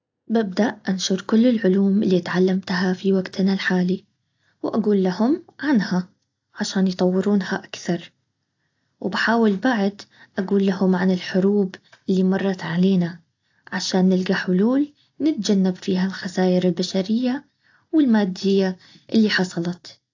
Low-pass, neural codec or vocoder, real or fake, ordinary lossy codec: 7.2 kHz; none; real; AAC, 48 kbps